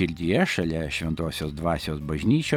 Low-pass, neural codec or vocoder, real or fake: 19.8 kHz; none; real